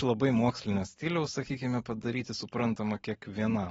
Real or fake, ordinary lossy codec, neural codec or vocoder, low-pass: real; AAC, 24 kbps; none; 19.8 kHz